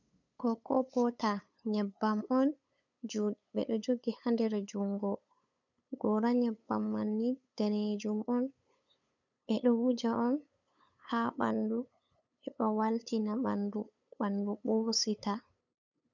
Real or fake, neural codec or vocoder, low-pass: fake; codec, 16 kHz, 8 kbps, FunCodec, trained on LibriTTS, 25 frames a second; 7.2 kHz